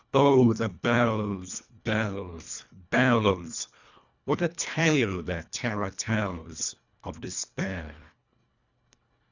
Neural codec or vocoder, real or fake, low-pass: codec, 24 kHz, 1.5 kbps, HILCodec; fake; 7.2 kHz